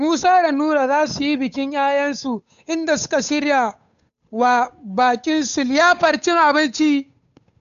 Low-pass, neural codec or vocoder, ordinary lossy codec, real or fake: 7.2 kHz; codec, 16 kHz, 8 kbps, FunCodec, trained on Chinese and English, 25 frames a second; none; fake